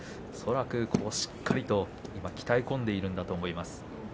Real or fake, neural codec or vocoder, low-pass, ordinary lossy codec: real; none; none; none